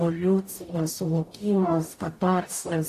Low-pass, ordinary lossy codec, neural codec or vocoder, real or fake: 14.4 kHz; AAC, 64 kbps; codec, 44.1 kHz, 0.9 kbps, DAC; fake